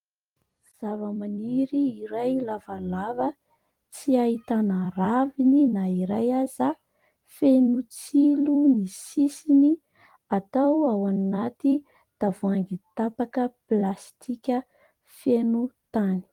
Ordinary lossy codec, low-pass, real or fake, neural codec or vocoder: Opus, 24 kbps; 19.8 kHz; fake; vocoder, 44.1 kHz, 128 mel bands every 256 samples, BigVGAN v2